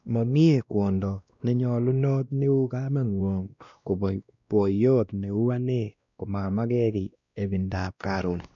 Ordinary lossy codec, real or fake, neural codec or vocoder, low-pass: none; fake; codec, 16 kHz, 1 kbps, X-Codec, WavLM features, trained on Multilingual LibriSpeech; 7.2 kHz